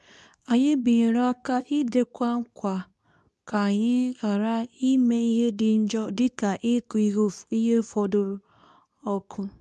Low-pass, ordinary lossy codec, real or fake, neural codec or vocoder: none; none; fake; codec, 24 kHz, 0.9 kbps, WavTokenizer, medium speech release version 2